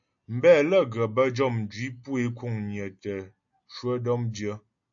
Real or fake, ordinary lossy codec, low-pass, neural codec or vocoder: real; AAC, 64 kbps; 7.2 kHz; none